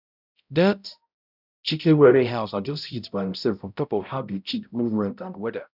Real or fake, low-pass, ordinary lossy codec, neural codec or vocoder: fake; 5.4 kHz; none; codec, 16 kHz, 0.5 kbps, X-Codec, HuBERT features, trained on balanced general audio